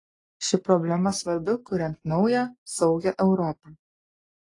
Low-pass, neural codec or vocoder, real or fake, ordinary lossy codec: 10.8 kHz; none; real; AAC, 32 kbps